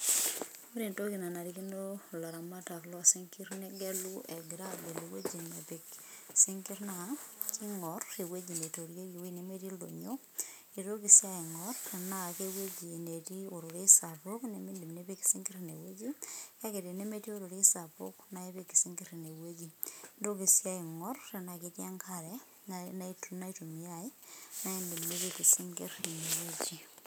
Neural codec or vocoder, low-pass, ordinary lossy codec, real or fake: none; none; none; real